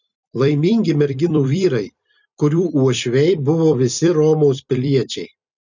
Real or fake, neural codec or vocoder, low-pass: fake; vocoder, 44.1 kHz, 128 mel bands every 256 samples, BigVGAN v2; 7.2 kHz